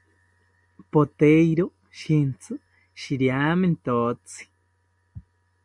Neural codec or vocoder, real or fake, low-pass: none; real; 10.8 kHz